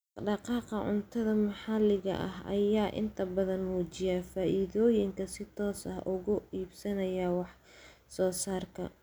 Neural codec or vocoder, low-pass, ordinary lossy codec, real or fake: none; none; none; real